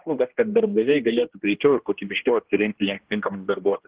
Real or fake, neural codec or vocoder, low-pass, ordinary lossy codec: fake; codec, 16 kHz, 1 kbps, X-Codec, HuBERT features, trained on general audio; 3.6 kHz; Opus, 16 kbps